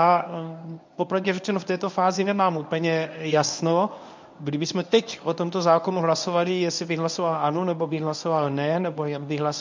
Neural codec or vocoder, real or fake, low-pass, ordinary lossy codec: codec, 24 kHz, 0.9 kbps, WavTokenizer, medium speech release version 1; fake; 7.2 kHz; MP3, 64 kbps